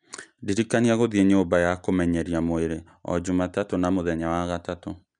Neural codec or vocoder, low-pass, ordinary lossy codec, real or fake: none; 9.9 kHz; none; real